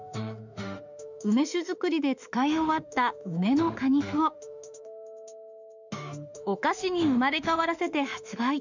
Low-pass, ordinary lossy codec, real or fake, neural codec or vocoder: 7.2 kHz; none; fake; autoencoder, 48 kHz, 32 numbers a frame, DAC-VAE, trained on Japanese speech